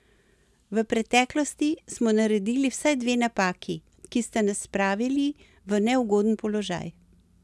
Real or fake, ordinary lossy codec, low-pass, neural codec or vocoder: real; none; none; none